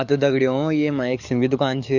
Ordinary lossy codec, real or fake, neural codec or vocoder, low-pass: none; fake; codec, 44.1 kHz, 7.8 kbps, DAC; 7.2 kHz